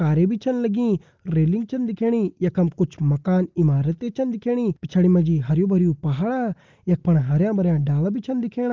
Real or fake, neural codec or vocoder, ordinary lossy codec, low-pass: real; none; Opus, 32 kbps; 7.2 kHz